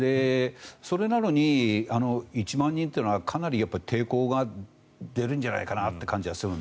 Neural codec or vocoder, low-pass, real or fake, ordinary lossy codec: none; none; real; none